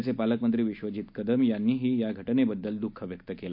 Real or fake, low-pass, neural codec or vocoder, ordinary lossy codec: real; 5.4 kHz; none; none